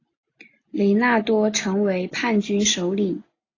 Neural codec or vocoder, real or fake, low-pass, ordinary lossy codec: none; real; 7.2 kHz; AAC, 32 kbps